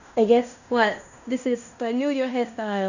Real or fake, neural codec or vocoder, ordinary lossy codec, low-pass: fake; codec, 16 kHz in and 24 kHz out, 0.9 kbps, LongCat-Audio-Codec, fine tuned four codebook decoder; none; 7.2 kHz